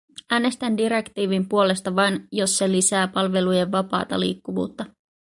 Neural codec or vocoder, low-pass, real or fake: none; 10.8 kHz; real